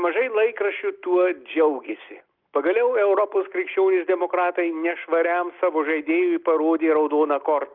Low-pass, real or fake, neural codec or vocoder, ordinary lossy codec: 5.4 kHz; real; none; Opus, 32 kbps